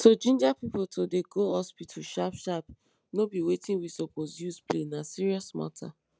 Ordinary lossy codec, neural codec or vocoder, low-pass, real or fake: none; none; none; real